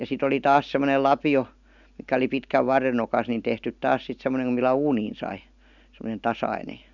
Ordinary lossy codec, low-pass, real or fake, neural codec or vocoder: none; 7.2 kHz; real; none